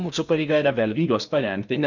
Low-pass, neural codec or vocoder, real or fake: 7.2 kHz; codec, 16 kHz in and 24 kHz out, 0.8 kbps, FocalCodec, streaming, 65536 codes; fake